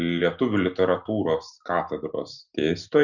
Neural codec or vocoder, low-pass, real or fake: none; 7.2 kHz; real